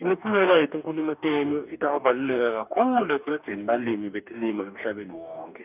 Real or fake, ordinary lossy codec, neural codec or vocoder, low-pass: fake; none; codec, 44.1 kHz, 2.6 kbps, DAC; 3.6 kHz